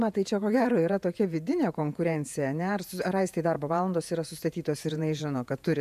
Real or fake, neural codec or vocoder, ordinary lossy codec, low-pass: fake; vocoder, 48 kHz, 128 mel bands, Vocos; MP3, 96 kbps; 14.4 kHz